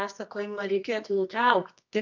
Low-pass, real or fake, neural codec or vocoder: 7.2 kHz; fake; codec, 24 kHz, 0.9 kbps, WavTokenizer, medium music audio release